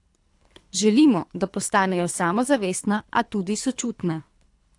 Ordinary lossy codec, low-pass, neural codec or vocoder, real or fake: AAC, 64 kbps; 10.8 kHz; codec, 24 kHz, 3 kbps, HILCodec; fake